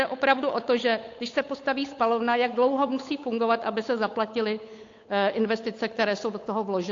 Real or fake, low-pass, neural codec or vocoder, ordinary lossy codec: fake; 7.2 kHz; codec, 16 kHz, 8 kbps, FunCodec, trained on Chinese and English, 25 frames a second; AAC, 48 kbps